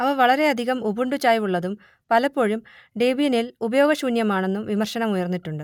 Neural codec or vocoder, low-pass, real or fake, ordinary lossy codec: none; 19.8 kHz; real; none